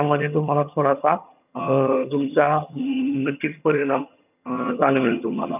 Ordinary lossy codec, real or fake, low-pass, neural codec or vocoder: none; fake; 3.6 kHz; vocoder, 22.05 kHz, 80 mel bands, HiFi-GAN